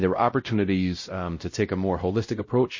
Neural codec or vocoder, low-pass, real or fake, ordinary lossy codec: codec, 16 kHz, 0.5 kbps, X-Codec, WavLM features, trained on Multilingual LibriSpeech; 7.2 kHz; fake; MP3, 32 kbps